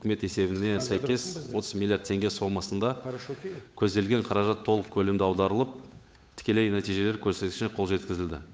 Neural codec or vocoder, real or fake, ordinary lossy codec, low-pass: codec, 16 kHz, 8 kbps, FunCodec, trained on Chinese and English, 25 frames a second; fake; none; none